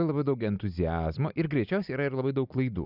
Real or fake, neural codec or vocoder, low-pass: real; none; 5.4 kHz